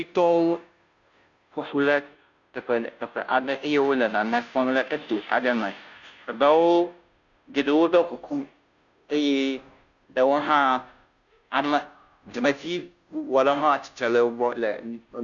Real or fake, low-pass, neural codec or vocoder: fake; 7.2 kHz; codec, 16 kHz, 0.5 kbps, FunCodec, trained on Chinese and English, 25 frames a second